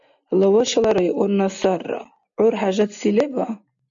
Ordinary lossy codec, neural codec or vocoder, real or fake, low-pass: MP3, 64 kbps; none; real; 7.2 kHz